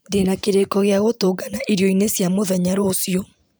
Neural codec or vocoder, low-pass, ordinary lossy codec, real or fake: vocoder, 44.1 kHz, 128 mel bands every 512 samples, BigVGAN v2; none; none; fake